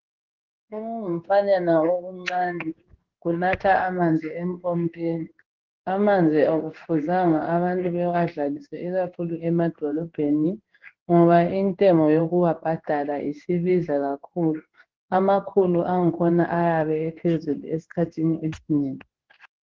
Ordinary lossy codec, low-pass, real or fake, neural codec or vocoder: Opus, 16 kbps; 7.2 kHz; fake; codec, 16 kHz in and 24 kHz out, 1 kbps, XY-Tokenizer